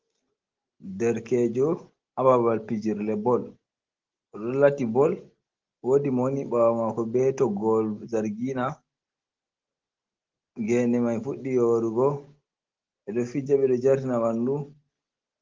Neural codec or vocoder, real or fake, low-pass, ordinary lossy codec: none; real; 7.2 kHz; Opus, 16 kbps